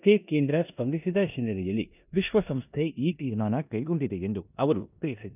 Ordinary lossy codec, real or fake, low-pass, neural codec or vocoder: none; fake; 3.6 kHz; codec, 16 kHz in and 24 kHz out, 0.9 kbps, LongCat-Audio-Codec, four codebook decoder